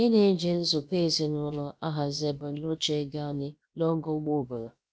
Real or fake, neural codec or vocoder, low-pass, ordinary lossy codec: fake; codec, 16 kHz, about 1 kbps, DyCAST, with the encoder's durations; none; none